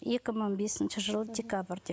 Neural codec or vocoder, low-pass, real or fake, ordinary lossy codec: none; none; real; none